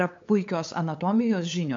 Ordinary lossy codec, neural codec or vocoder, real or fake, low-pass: MP3, 48 kbps; codec, 16 kHz, 8 kbps, FunCodec, trained on LibriTTS, 25 frames a second; fake; 7.2 kHz